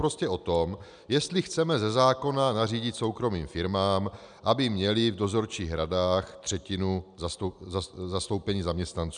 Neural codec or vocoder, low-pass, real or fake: none; 9.9 kHz; real